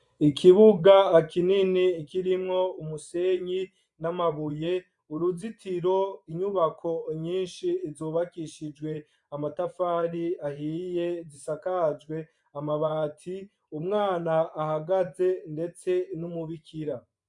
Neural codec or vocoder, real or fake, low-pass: vocoder, 24 kHz, 100 mel bands, Vocos; fake; 10.8 kHz